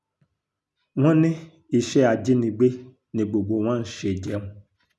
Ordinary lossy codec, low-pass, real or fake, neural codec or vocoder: none; none; real; none